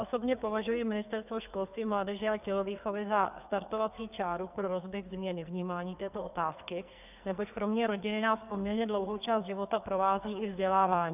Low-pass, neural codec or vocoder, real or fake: 3.6 kHz; codec, 44.1 kHz, 2.6 kbps, SNAC; fake